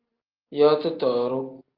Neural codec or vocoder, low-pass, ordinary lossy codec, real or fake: none; 5.4 kHz; Opus, 24 kbps; real